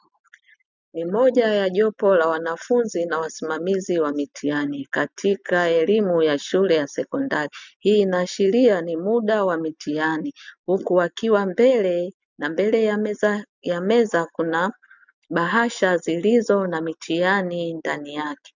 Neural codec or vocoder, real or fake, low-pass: none; real; 7.2 kHz